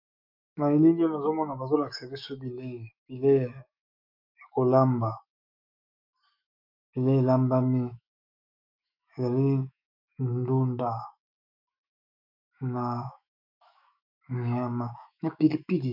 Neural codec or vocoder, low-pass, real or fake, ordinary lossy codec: autoencoder, 48 kHz, 128 numbers a frame, DAC-VAE, trained on Japanese speech; 5.4 kHz; fake; MP3, 48 kbps